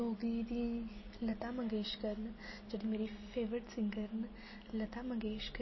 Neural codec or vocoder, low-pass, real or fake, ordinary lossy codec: none; 7.2 kHz; real; MP3, 24 kbps